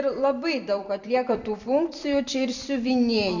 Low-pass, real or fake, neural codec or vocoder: 7.2 kHz; fake; vocoder, 44.1 kHz, 128 mel bands every 256 samples, BigVGAN v2